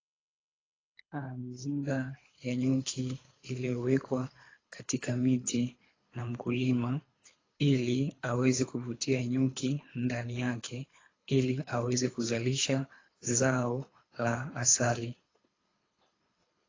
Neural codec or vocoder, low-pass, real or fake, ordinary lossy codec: codec, 24 kHz, 3 kbps, HILCodec; 7.2 kHz; fake; AAC, 32 kbps